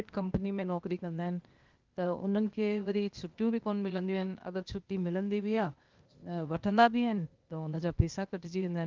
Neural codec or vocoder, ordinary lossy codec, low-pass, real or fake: codec, 16 kHz, 0.8 kbps, ZipCodec; Opus, 32 kbps; 7.2 kHz; fake